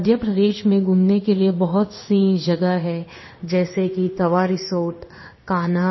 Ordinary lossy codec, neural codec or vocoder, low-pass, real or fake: MP3, 24 kbps; codec, 16 kHz in and 24 kHz out, 1 kbps, XY-Tokenizer; 7.2 kHz; fake